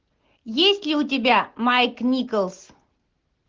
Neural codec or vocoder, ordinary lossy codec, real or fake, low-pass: none; Opus, 16 kbps; real; 7.2 kHz